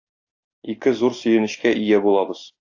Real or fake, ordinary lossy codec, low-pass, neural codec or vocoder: real; AAC, 48 kbps; 7.2 kHz; none